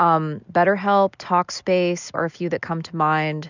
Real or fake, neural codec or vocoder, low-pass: real; none; 7.2 kHz